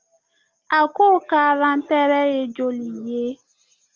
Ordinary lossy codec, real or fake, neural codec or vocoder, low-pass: Opus, 32 kbps; real; none; 7.2 kHz